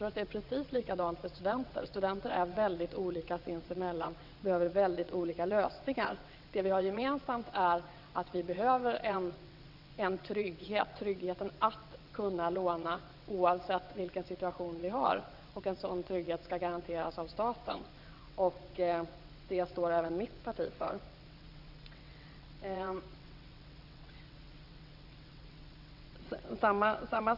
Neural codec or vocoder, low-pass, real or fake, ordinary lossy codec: vocoder, 22.05 kHz, 80 mel bands, WaveNeXt; 5.4 kHz; fake; Opus, 64 kbps